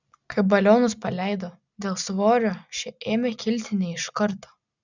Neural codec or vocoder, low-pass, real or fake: none; 7.2 kHz; real